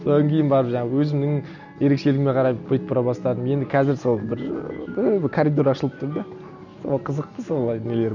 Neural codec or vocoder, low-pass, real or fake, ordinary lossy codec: none; 7.2 kHz; real; none